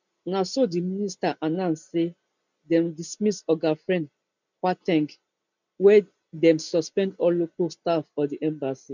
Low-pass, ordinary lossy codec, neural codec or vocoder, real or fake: 7.2 kHz; none; none; real